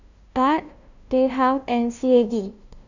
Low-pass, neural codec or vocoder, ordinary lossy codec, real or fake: 7.2 kHz; codec, 16 kHz, 0.5 kbps, FunCodec, trained on LibriTTS, 25 frames a second; none; fake